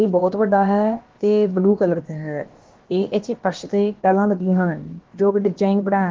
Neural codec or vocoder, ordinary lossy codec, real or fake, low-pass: codec, 16 kHz, about 1 kbps, DyCAST, with the encoder's durations; Opus, 16 kbps; fake; 7.2 kHz